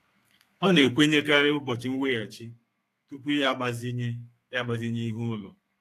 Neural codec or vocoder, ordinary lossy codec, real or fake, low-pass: codec, 32 kHz, 1.9 kbps, SNAC; AAC, 64 kbps; fake; 14.4 kHz